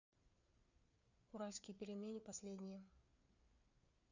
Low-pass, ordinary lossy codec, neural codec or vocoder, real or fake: 7.2 kHz; none; codec, 16 kHz, 4 kbps, FreqCodec, larger model; fake